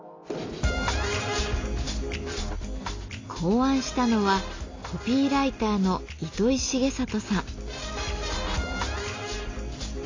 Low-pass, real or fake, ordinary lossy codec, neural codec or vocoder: 7.2 kHz; real; AAC, 32 kbps; none